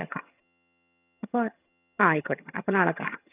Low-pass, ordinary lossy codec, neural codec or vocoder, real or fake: 3.6 kHz; none; vocoder, 22.05 kHz, 80 mel bands, HiFi-GAN; fake